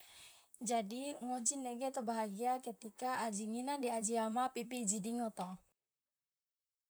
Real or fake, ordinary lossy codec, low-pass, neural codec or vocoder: fake; none; none; vocoder, 44.1 kHz, 128 mel bands, Pupu-Vocoder